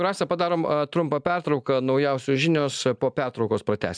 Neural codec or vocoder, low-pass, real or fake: none; 9.9 kHz; real